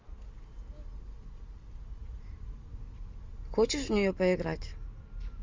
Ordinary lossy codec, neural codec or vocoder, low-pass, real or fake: Opus, 32 kbps; none; 7.2 kHz; real